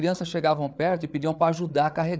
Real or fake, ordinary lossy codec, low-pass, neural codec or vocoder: fake; none; none; codec, 16 kHz, 8 kbps, FreqCodec, larger model